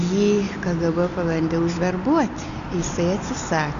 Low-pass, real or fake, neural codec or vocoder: 7.2 kHz; real; none